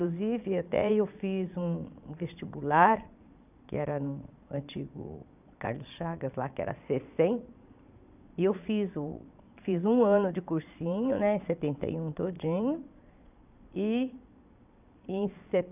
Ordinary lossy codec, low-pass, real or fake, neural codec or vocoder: none; 3.6 kHz; fake; vocoder, 22.05 kHz, 80 mel bands, Vocos